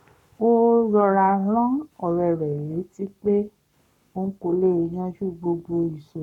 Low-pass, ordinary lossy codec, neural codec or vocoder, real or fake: 19.8 kHz; none; codec, 44.1 kHz, 7.8 kbps, Pupu-Codec; fake